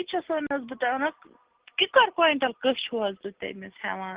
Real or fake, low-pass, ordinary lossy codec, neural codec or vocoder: real; 3.6 kHz; Opus, 16 kbps; none